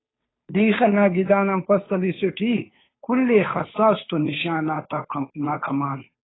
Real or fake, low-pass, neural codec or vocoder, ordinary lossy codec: fake; 7.2 kHz; codec, 16 kHz, 2 kbps, FunCodec, trained on Chinese and English, 25 frames a second; AAC, 16 kbps